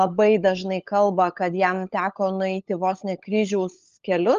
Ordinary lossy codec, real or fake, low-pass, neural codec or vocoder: Opus, 32 kbps; fake; 7.2 kHz; codec, 16 kHz, 16 kbps, FunCodec, trained on LibriTTS, 50 frames a second